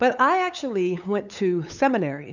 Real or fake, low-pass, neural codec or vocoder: fake; 7.2 kHz; codec, 16 kHz, 8 kbps, FunCodec, trained on LibriTTS, 25 frames a second